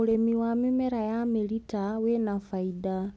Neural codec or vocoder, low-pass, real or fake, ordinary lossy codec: none; none; real; none